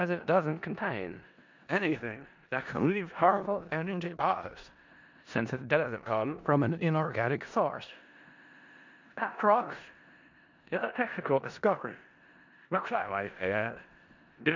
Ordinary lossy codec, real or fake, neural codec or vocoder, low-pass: MP3, 64 kbps; fake; codec, 16 kHz in and 24 kHz out, 0.4 kbps, LongCat-Audio-Codec, four codebook decoder; 7.2 kHz